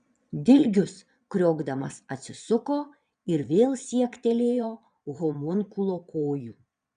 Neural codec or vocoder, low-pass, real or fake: vocoder, 22.05 kHz, 80 mel bands, Vocos; 9.9 kHz; fake